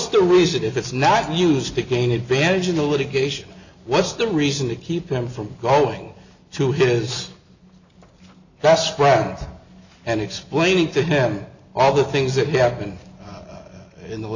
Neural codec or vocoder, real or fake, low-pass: none; real; 7.2 kHz